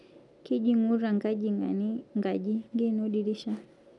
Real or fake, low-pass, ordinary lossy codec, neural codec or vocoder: real; 10.8 kHz; none; none